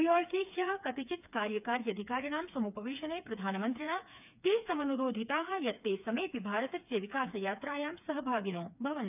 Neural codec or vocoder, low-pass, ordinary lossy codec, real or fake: codec, 16 kHz, 4 kbps, FreqCodec, smaller model; 3.6 kHz; none; fake